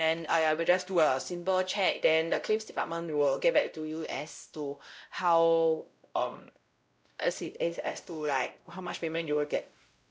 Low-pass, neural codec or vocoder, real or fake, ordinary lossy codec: none; codec, 16 kHz, 0.5 kbps, X-Codec, WavLM features, trained on Multilingual LibriSpeech; fake; none